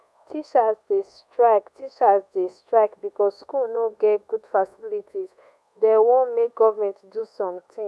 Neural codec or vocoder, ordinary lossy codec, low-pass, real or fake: codec, 24 kHz, 1.2 kbps, DualCodec; none; none; fake